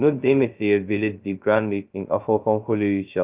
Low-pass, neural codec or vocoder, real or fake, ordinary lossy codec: 3.6 kHz; codec, 16 kHz, 0.2 kbps, FocalCodec; fake; Opus, 32 kbps